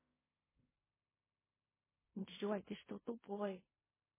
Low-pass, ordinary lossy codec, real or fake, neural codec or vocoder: 3.6 kHz; MP3, 16 kbps; fake; codec, 16 kHz in and 24 kHz out, 0.4 kbps, LongCat-Audio-Codec, fine tuned four codebook decoder